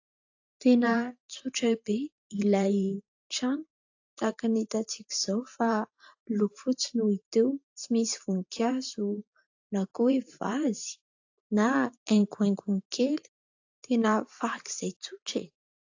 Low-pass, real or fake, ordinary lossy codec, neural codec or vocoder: 7.2 kHz; fake; AAC, 48 kbps; vocoder, 44.1 kHz, 128 mel bands every 512 samples, BigVGAN v2